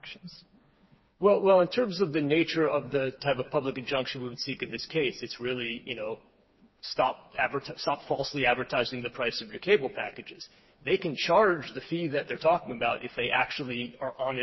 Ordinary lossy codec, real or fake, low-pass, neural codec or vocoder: MP3, 24 kbps; fake; 7.2 kHz; codec, 16 kHz, 4 kbps, FreqCodec, smaller model